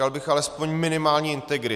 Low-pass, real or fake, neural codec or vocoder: 14.4 kHz; real; none